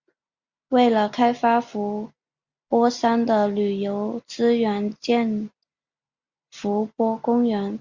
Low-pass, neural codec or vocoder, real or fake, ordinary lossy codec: 7.2 kHz; none; real; Opus, 64 kbps